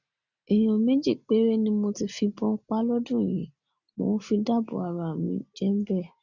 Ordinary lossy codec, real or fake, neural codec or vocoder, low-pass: none; real; none; 7.2 kHz